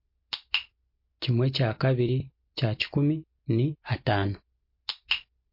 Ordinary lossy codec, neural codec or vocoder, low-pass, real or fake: MP3, 32 kbps; none; 5.4 kHz; real